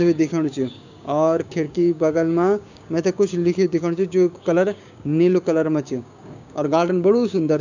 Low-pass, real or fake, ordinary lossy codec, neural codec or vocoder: 7.2 kHz; fake; none; codec, 16 kHz, 6 kbps, DAC